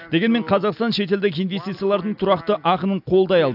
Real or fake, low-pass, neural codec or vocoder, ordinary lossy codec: real; 5.4 kHz; none; AAC, 48 kbps